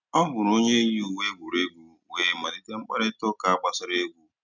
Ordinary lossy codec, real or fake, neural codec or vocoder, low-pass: none; real; none; 7.2 kHz